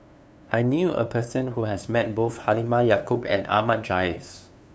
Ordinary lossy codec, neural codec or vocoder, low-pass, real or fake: none; codec, 16 kHz, 2 kbps, FunCodec, trained on LibriTTS, 25 frames a second; none; fake